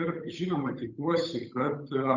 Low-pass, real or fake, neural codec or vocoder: 7.2 kHz; fake; codec, 16 kHz, 8 kbps, FunCodec, trained on Chinese and English, 25 frames a second